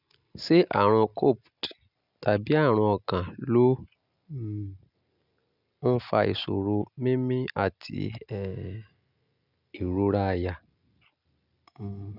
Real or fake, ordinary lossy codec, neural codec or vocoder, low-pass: real; none; none; 5.4 kHz